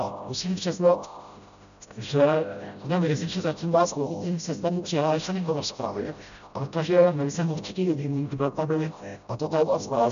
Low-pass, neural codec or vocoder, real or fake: 7.2 kHz; codec, 16 kHz, 0.5 kbps, FreqCodec, smaller model; fake